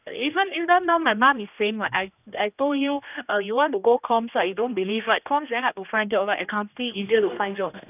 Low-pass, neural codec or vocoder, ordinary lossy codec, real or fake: 3.6 kHz; codec, 16 kHz, 1 kbps, X-Codec, HuBERT features, trained on general audio; none; fake